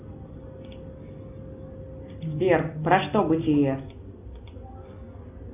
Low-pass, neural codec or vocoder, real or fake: 3.6 kHz; none; real